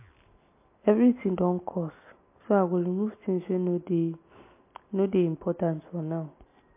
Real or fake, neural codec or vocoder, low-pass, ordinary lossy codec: real; none; 3.6 kHz; MP3, 24 kbps